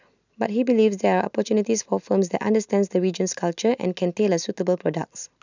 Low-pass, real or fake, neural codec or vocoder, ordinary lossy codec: 7.2 kHz; real; none; none